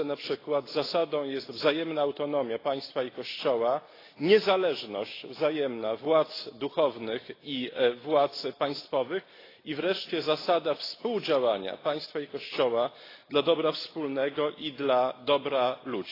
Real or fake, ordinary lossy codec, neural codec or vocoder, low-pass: real; AAC, 24 kbps; none; 5.4 kHz